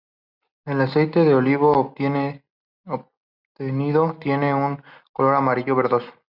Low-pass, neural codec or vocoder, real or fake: 5.4 kHz; none; real